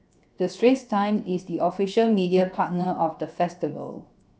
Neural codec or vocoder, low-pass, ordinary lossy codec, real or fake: codec, 16 kHz, 0.7 kbps, FocalCodec; none; none; fake